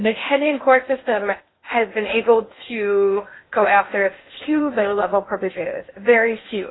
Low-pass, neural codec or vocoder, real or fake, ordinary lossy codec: 7.2 kHz; codec, 16 kHz in and 24 kHz out, 0.6 kbps, FocalCodec, streaming, 2048 codes; fake; AAC, 16 kbps